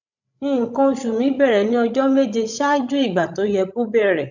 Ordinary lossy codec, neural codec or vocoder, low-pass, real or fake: none; codec, 16 kHz, 16 kbps, FreqCodec, larger model; 7.2 kHz; fake